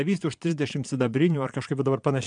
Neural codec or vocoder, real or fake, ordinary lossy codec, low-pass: vocoder, 22.05 kHz, 80 mel bands, Vocos; fake; MP3, 96 kbps; 9.9 kHz